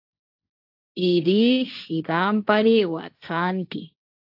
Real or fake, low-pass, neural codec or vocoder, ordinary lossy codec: fake; 5.4 kHz; codec, 16 kHz, 1.1 kbps, Voila-Tokenizer; AAC, 48 kbps